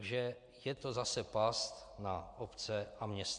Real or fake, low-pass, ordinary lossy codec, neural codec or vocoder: real; 9.9 kHz; MP3, 96 kbps; none